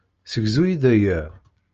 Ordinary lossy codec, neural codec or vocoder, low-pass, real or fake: Opus, 24 kbps; none; 7.2 kHz; real